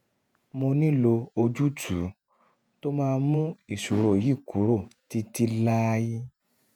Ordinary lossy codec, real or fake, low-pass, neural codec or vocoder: none; fake; 19.8 kHz; vocoder, 48 kHz, 128 mel bands, Vocos